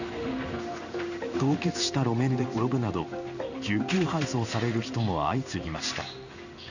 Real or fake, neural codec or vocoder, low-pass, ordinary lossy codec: fake; codec, 16 kHz in and 24 kHz out, 1 kbps, XY-Tokenizer; 7.2 kHz; none